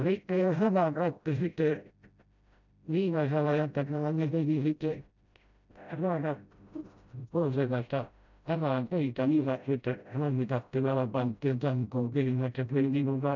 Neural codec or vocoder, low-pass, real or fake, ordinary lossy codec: codec, 16 kHz, 0.5 kbps, FreqCodec, smaller model; 7.2 kHz; fake; none